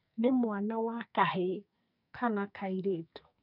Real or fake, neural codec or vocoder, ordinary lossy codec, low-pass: fake; codec, 44.1 kHz, 2.6 kbps, SNAC; none; 5.4 kHz